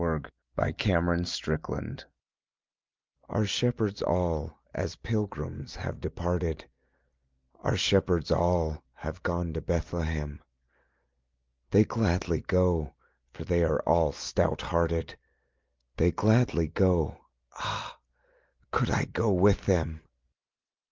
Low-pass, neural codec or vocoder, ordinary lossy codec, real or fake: 7.2 kHz; none; Opus, 24 kbps; real